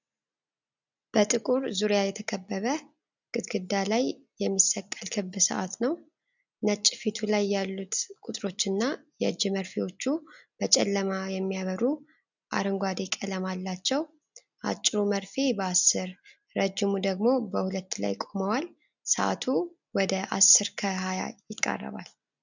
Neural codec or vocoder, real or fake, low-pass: none; real; 7.2 kHz